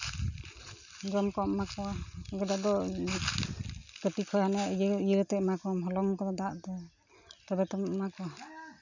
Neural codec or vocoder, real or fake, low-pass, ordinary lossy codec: none; real; 7.2 kHz; none